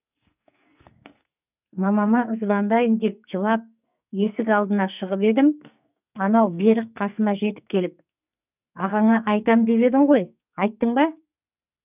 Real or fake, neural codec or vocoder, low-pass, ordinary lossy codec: fake; codec, 44.1 kHz, 2.6 kbps, SNAC; 3.6 kHz; none